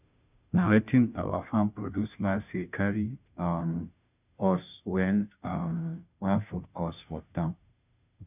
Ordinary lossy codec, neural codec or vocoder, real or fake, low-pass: none; codec, 16 kHz, 0.5 kbps, FunCodec, trained on Chinese and English, 25 frames a second; fake; 3.6 kHz